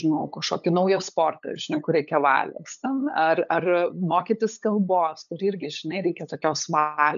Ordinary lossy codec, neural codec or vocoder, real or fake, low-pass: MP3, 96 kbps; codec, 16 kHz, 8 kbps, FunCodec, trained on LibriTTS, 25 frames a second; fake; 7.2 kHz